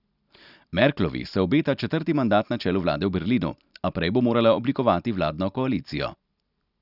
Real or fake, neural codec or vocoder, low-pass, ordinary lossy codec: real; none; 5.4 kHz; none